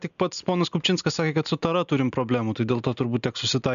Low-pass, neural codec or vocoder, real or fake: 7.2 kHz; none; real